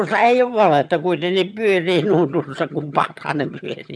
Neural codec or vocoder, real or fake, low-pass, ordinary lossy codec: vocoder, 22.05 kHz, 80 mel bands, HiFi-GAN; fake; none; none